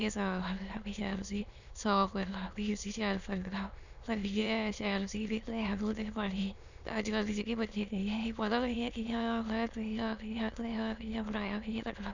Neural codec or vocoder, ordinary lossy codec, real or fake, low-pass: autoencoder, 22.05 kHz, a latent of 192 numbers a frame, VITS, trained on many speakers; none; fake; 7.2 kHz